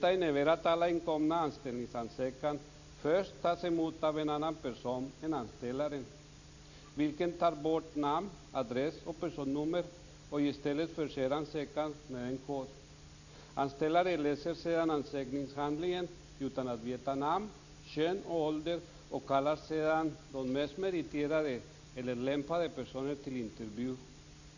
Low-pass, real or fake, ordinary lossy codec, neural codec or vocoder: 7.2 kHz; real; none; none